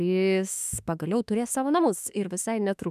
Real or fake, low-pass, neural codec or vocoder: fake; 14.4 kHz; autoencoder, 48 kHz, 32 numbers a frame, DAC-VAE, trained on Japanese speech